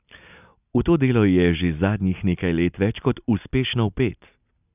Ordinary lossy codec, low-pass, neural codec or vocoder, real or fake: none; 3.6 kHz; none; real